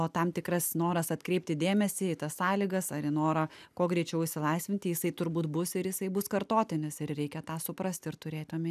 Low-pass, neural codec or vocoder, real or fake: 14.4 kHz; none; real